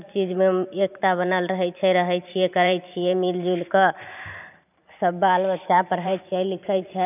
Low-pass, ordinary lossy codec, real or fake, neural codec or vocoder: 3.6 kHz; none; fake; vocoder, 44.1 kHz, 128 mel bands every 512 samples, BigVGAN v2